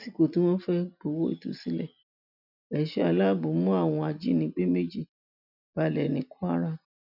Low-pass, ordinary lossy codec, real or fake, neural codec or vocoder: 5.4 kHz; none; real; none